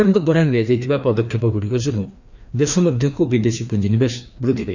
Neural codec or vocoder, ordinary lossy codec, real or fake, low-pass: codec, 16 kHz, 2 kbps, FreqCodec, larger model; none; fake; 7.2 kHz